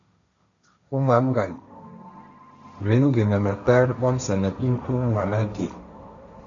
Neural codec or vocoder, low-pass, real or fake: codec, 16 kHz, 1.1 kbps, Voila-Tokenizer; 7.2 kHz; fake